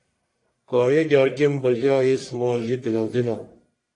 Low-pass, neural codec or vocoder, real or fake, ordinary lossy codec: 10.8 kHz; codec, 44.1 kHz, 1.7 kbps, Pupu-Codec; fake; AAC, 48 kbps